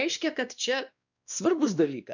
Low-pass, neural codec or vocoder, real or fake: 7.2 kHz; codec, 16 kHz, 2 kbps, X-Codec, WavLM features, trained on Multilingual LibriSpeech; fake